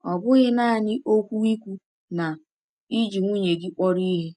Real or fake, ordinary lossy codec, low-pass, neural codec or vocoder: real; none; 10.8 kHz; none